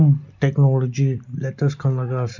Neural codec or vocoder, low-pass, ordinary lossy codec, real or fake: none; 7.2 kHz; none; real